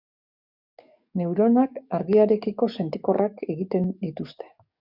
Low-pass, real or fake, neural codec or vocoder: 5.4 kHz; fake; codec, 44.1 kHz, 7.8 kbps, DAC